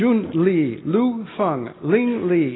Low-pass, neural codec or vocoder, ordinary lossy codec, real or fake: 7.2 kHz; none; AAC, 16 kbps; real